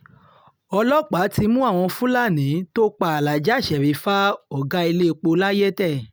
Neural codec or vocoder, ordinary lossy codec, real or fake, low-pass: none; none; real; none